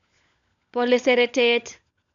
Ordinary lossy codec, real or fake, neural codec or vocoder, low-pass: none; fake; codec, 16 kHz, 16 kbps, FunCodec, trained on LibriTTS, 50 frames a second; 7.2 kHz